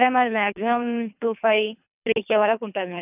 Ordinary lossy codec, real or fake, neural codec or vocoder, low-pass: none; fake; codec, 24 kHz, 3 kbps, HILCodec; 3.6 kHz